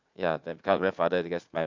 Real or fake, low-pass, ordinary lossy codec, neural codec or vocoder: fake; 7.2 kHz; MP3, 64 kbps; vocoder, 44.1 kHz, 80 mel bands, Vocos